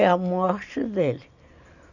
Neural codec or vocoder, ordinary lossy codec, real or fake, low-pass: none; none; real; 7.2 kHz